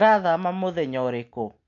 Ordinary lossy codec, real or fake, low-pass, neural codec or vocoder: none; real; 7.2 kHz; none